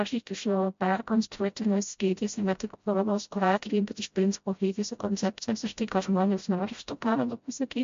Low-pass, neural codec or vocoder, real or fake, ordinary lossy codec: 7.2 kHz; codec, 16 kHz, 0.5 kbps, FreqCodec, smaller model; fake; MP3, 48 kbps